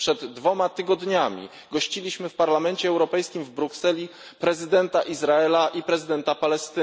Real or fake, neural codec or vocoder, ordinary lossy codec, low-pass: real; none; none; none